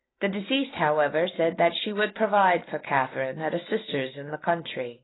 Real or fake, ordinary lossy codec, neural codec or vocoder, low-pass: real; AAC, 16 kbps; none; 7.2 kHz